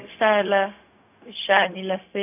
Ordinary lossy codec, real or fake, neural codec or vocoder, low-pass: none; fake; codec, 16 kHz, 0.4 kbps, LongCat-Audio-Codec; 3.6 kHz